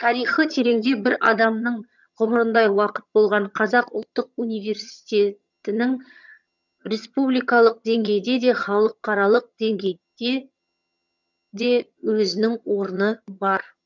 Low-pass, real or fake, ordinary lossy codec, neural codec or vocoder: 7.2 kHz; fake; none; vocoder, 22.05 kHz, 80 mel bands, HiFi-GAN